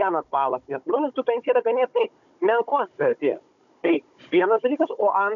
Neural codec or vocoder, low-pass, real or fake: codec, 16 kHz, 16 kbps, FunCodec, trained on Chinese and English, 50 frames a second; 7.2 kHz; fake